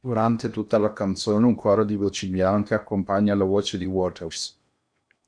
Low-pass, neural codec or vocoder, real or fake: 9.9 kHz; codec, 16 kHz in and 24 kHz out, 0.8 kbps, FocalCodec, streaming, 65536 codes; fake